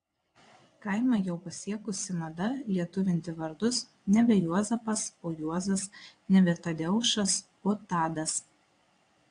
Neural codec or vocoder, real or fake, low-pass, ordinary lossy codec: vocoder, 22.05 kHz, 80 mel bands, WaveNeXt; fake; 9.9 kHz; AAC, 48 kbps